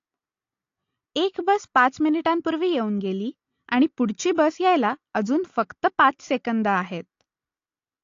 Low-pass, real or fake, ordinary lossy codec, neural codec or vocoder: 7.2 kHz; real; AAC, 48 kbps; none